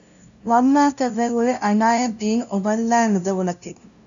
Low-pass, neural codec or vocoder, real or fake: 7.2 kHz; codec, 16 kHz, 0.5 kbps, FunCodec, trained on LibriTTS, 25 frames a second; fake